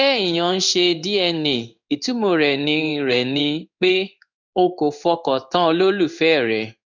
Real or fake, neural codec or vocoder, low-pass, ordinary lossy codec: fake; codec, 16 kHz in and 24 kHz out, 1 kbps, XY-Tokenizer; 7.2 kHz; none